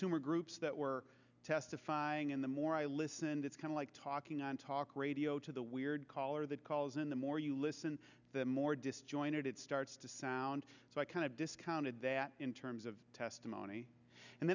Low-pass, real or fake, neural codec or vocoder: 7.2 kHz; real; none